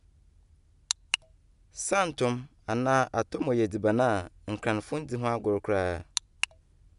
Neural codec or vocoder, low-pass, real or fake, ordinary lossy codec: none; 10.8 kHz; real; none